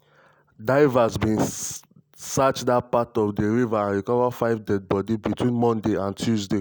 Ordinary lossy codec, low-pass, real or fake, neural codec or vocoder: none; none; real; none